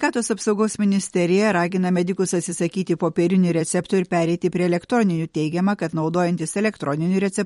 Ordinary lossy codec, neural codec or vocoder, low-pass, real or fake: MP3, 48 kbps; vocoder, 44.1 kHz, 128 mel bands every 256 samples, BigVGAN v2; 19.8 kHz; fake